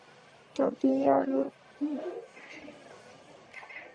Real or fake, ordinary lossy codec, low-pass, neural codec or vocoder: fake; Opus, 64 kbps; 9.9 kHz; codec, 44.1 kHz, 1.7 kbps, Pupu-Codec